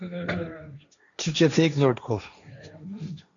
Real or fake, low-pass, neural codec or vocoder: fake; 7.2 kHz; codec, 16 kHz, 1.1 kbps, Voila-Tokenizer